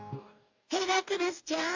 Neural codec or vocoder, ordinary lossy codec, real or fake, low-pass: codec, 44.1 kHz, 2.6 kbps, DAC; AAC, 48 kbps; fake; 7.2 kHz